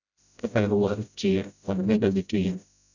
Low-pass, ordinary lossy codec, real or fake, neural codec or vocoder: 7.2 kHz; none; fake; codec, 16 kHz, 0.5 kbps, FreqCodec, smaller model